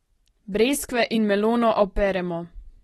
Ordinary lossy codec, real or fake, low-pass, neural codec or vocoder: AAC, 32 kbps; real; 19.8 kHz; none